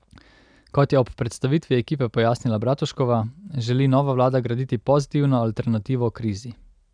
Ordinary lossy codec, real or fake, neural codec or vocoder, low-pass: none; real; none; 9.9 kHz